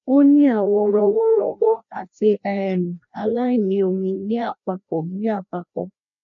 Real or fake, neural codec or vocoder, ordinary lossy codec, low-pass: fake; codec, 16 kHz, 1 kbps, FreqCodec, larger model; none; 7.2 kHz